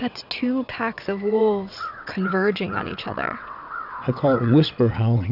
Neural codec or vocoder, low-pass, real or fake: vocoder, 22.05 kHz, 80 mel bands, WaveNeXt; 5.4 kHz; fake